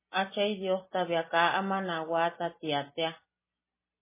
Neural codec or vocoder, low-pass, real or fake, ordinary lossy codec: none; 3.6 kHz; real; MP3, 16 kbps